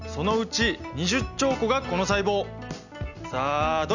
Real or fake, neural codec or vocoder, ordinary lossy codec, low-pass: real; none; none; 7.2 kHz